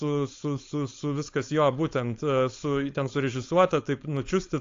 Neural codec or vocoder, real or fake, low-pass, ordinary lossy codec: codec, 16 kHz, 4.8 kbps, FACodec; fake; 7.2 kHz; AAC, 48 kbps